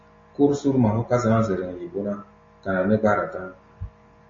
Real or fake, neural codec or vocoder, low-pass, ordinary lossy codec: real; none; 7.2 kHz; MP3, 32 kbps